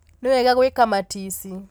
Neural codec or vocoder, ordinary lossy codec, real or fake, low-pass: none; none; real; none